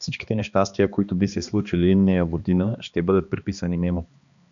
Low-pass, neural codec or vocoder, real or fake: 7.2 kHz; codec, 16 kHz, 2 kbps, X-Codec, HuBERT features, trained on balanced general audio; fake